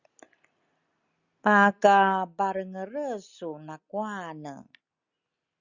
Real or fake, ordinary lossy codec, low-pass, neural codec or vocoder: real; Opus, 64 kbps; 7.2 kHz; none